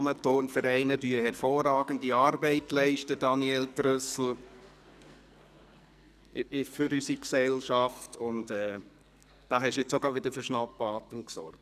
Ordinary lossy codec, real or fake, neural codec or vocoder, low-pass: none; fake; codec, 44.1 kHz, 2.6 kbps, SNAC; 14.4 kHz